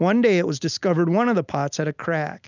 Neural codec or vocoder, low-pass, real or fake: none; 7.2 kHz; real